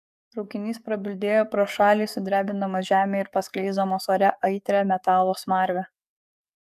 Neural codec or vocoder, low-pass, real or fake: codec, 44.1 kHz, 7.8 kbps, DAC; 14.4 kHz; fake